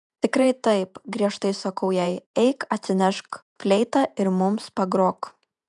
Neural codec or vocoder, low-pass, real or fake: vocoder, 48 kHz, 128 mel bands, Vocos; 10.8 kHz; fake